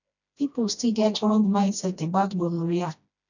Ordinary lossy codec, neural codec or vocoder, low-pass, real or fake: none; codec, 16 kHz, 1 kbps, FreqCodec, smaller model; 7.2 kHz; fake